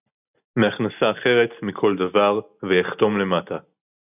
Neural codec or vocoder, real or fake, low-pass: none; real; 3.6 kHz